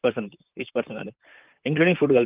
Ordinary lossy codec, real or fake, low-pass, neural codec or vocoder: Opus, 24 kbps; fake; 3.6 kHz; vocoder, 44.1 kHz, 128 mel bands, Pupu-Vocoder